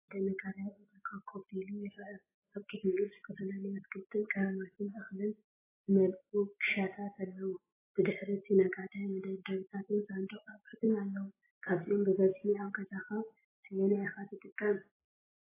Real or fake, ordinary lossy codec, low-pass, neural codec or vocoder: real; AAC, 16 kbps; 3.6 kHz; none